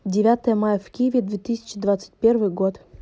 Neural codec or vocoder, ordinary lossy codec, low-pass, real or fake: none; none; none; real